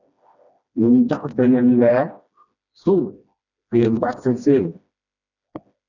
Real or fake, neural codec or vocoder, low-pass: fake; codec, 16 kHz, 1 kbps, FreqCodec, smaller model; 7.2 kHz